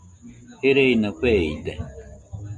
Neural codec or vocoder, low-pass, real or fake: none; 10.8 kHz; real